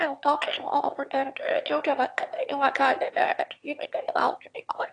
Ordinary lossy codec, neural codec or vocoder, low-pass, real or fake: AAC, 48 kbps; autoencoder, 22.05 kHz, a latent of 192 numbers a frame, VITS, trained on one speaker; 9.9 kHz; fake